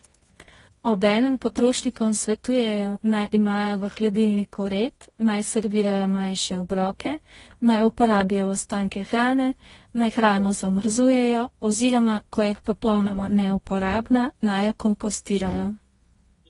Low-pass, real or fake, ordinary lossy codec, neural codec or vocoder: 10.8 kHz; fake; AAC, 32 kbps; codec, 24 kHz, 0.9 kbps, WavTokenizer, medium music audio release